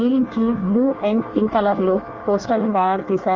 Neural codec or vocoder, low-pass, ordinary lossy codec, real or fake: codec, 24 kHz, 1 kbps, SNAC; 7.2 kHz; Opus, 24 kbps; fake